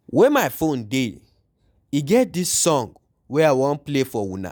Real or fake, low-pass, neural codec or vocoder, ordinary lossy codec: real; none; none; none